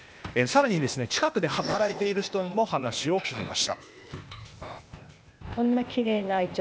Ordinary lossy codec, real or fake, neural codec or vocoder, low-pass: none; fake; codec, 16 kHz, 0.8 kbps, ZipCodec; none